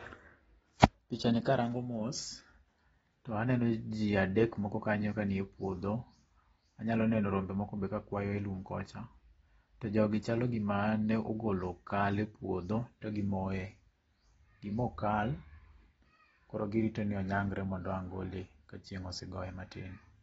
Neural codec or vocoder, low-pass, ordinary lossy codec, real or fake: none; 19.8 kHz; AAC, 24 kbps; real